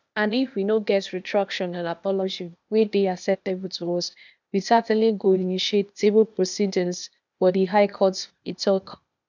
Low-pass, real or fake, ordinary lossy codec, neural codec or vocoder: 7.2 kHz; fake; none; codec, 16 kHz, 0.8 kbps, ZipCodec